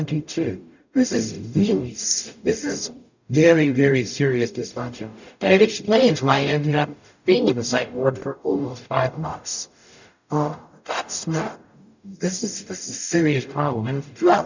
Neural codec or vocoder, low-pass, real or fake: codec, 44.1 kHz, 0.9 kbps, DAC; 7.2 kHz; fake